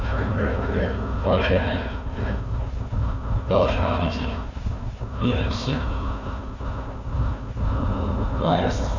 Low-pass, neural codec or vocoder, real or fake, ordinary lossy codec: 7.2 kHz; codec, 16 kHz, 1 kbps, FunCodec, trained on Chinese and English, 50 frames a second; fake; none